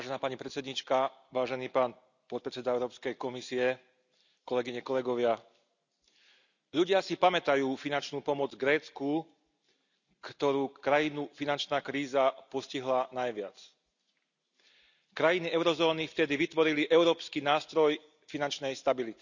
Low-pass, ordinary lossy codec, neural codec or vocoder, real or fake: 7.2 kHz; none; none; real